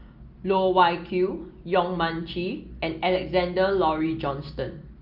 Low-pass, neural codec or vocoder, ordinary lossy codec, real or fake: 5.4 kHz; none; Opus, 32 kbps; real